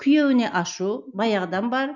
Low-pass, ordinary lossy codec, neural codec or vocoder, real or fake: 7.2 kHz; none; none; real